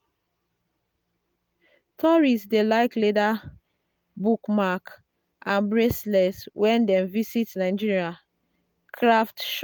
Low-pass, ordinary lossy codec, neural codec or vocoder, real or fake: none; none; none; real